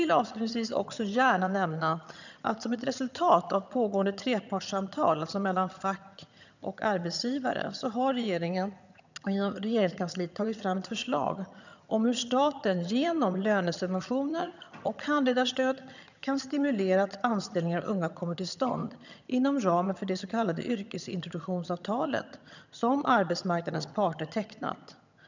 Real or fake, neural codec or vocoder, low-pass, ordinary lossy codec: fake; vocoder, 22.05 kHz, 80 mel bands, HiFi-GAN; 7.2 kHz; none